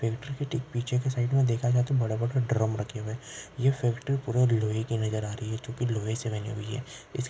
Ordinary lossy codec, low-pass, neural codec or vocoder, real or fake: none; none; none; real